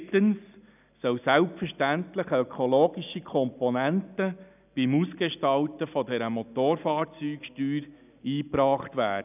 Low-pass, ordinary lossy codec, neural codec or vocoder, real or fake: 3.6 kHz; none; none; real